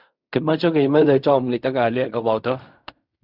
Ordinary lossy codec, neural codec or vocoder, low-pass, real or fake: Opus, 64 kbps; codec, 16 kHz in and 24 kHz out, 0.4 kbps, LongCat-Audio-Codec, fine tuned four codebook decoder; 5.4 kHz; fake